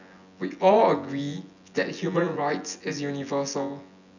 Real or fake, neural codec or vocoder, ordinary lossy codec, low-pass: fake; vocoder, 24 kHz, 100 mel bands, Vocos; none; 7.2 kHz